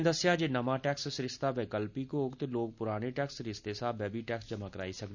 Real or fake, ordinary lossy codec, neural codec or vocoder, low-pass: real; none; none; 7.2 kHz